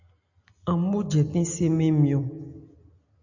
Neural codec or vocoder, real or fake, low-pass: none; real; 7.2 kHz